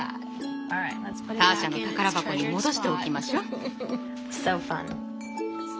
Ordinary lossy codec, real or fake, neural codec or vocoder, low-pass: none; real; none; none